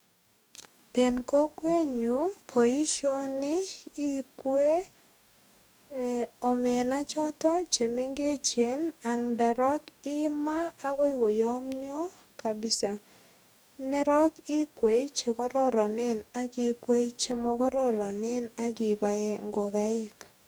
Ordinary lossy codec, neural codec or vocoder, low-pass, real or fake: none; codec, 44.1 kHz, 2.6 kbps, DAC; none; fake